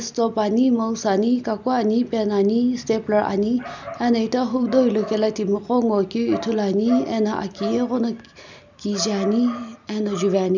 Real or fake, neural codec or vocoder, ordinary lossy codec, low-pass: real; none; none; 7.2 kHz